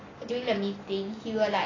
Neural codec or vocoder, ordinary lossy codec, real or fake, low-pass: none; AAC, 32 kbps; real; 7.2 kHz